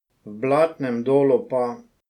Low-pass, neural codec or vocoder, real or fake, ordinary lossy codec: 19.8 kHz; none; real; none